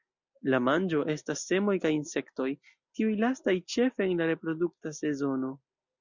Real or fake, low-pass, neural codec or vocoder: real; 7.2 kHz; none